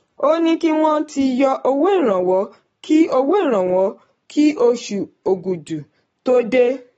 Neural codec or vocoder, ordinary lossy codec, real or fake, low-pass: codec, 44.1 kHz, 7.8 kbps, Pupu-Codec; AAC, 24 kbps; fake; 19.8 kHz